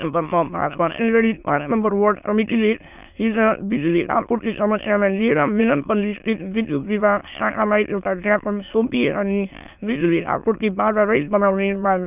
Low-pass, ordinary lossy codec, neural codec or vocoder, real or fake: 3.6 kHz; none; autoencoder, 22.05 kHz, a latent of 192 numbers a frame, VITS, trained on many speakers; fake